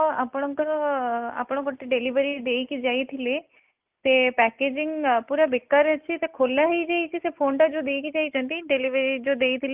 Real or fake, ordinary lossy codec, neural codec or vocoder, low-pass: real; Opus, 32 kbps; none; 3.6 kHz